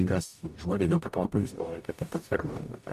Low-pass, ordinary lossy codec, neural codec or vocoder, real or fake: 14.4 kHz; MP3, 64 kbps; codec, 44.1 kHz, 0.9 kbps, DAC; fake